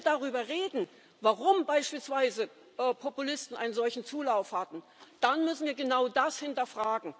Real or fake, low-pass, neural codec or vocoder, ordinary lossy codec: real; none; none; none